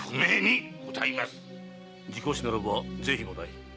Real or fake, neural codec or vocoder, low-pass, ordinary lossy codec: real; none; none; none